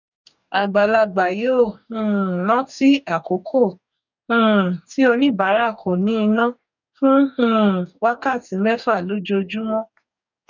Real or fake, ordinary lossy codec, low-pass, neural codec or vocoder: fake; none; 7.2 kHz; codec, 44.1 kHz, 2.6 kbps, DAC